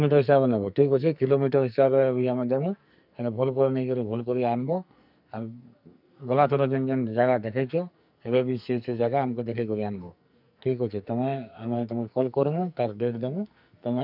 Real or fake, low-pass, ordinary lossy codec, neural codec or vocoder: fake; 5.4 kHz; none; codec, 44.1 kHz, 2.6 kbps, SNAC